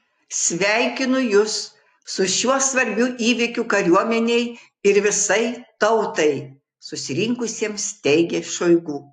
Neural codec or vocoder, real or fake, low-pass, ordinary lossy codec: none; real; 9.9 kHz; AAC, 64 kbps